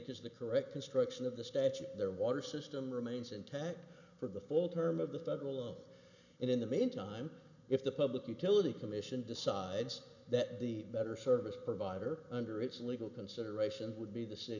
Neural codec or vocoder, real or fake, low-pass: none; real; 7.2 kHz